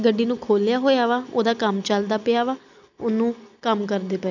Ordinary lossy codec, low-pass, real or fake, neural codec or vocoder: none; 7.2 kHz; real; none